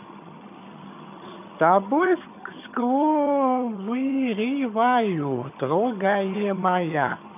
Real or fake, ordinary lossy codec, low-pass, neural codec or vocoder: fake; none; 3.6 kHz; vocoder, 22.05 kHz, 80 mel bands, HiFi-GAN